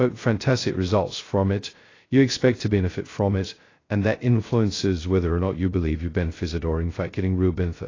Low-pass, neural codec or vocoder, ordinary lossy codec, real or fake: 7.2 kHz; codec, 16 kHz, 0.2 kbps, FocalCodec; AAC, 32 kbps; fake